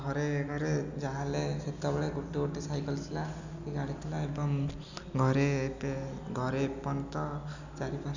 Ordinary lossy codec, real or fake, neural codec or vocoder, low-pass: none; real; none; 7.2 kHz